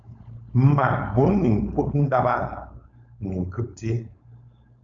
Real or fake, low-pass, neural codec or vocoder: fake; 7.2 kHz; codec, 16 kHz, 4.8 kbps, FACodec